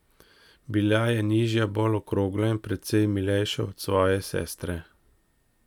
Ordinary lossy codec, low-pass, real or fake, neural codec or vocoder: none; 19.8 kHz; real; none